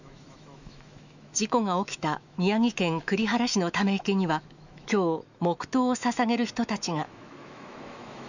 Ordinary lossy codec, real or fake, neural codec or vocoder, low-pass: none; fake; autoencoder, 48 kHz, 128 numbers a frame, DAC-VAE, trained on Japanese speech; 7.2 kHz